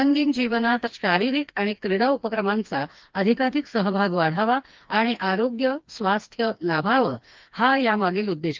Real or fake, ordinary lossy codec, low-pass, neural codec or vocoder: fake; Opus, 24 kbps; 7.2 kHz; codec, 16 kHz, 2 kbps, FreqCodec, smaller model